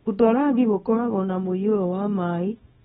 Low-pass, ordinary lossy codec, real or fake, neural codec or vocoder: 10.8 kHz; AAC, 16 kbps; fake; codec, 24 kHz, 0.9 kbps, WavTokenizer, small release